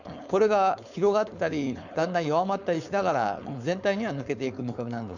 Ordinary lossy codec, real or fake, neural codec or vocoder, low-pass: none; fake; codec, 16 kHz, 4.8 kbps, FACodec; 7.2 kHz